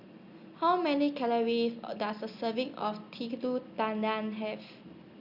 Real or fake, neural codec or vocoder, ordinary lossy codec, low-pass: real; none; Opus, 64 kbps; 5.4 kHz